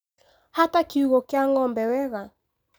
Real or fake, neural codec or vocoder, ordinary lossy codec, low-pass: real; none; none; none